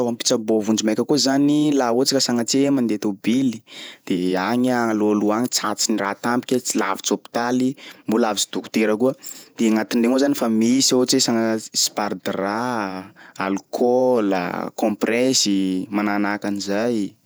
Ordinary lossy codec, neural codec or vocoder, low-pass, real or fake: none; vocoder, 48 kHz, 128 mel bands, Vocos; none; fake